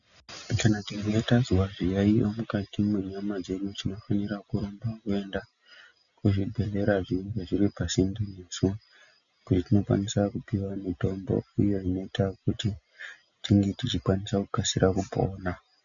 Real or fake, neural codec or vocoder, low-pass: real; none; 7.2 kHz